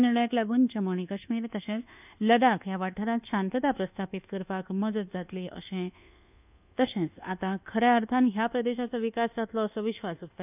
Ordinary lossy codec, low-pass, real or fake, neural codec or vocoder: none; 3.6 kHz; fake; codec, 24 kHz, 1.2 kbps, DualCodec